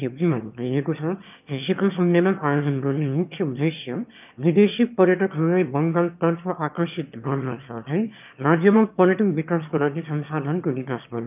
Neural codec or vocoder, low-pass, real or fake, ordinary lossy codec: autoencoder, 22.05 kHz, a latent of 192 numbers a frame, VITS, trained on one speaker; 3.6 kHz; fake; none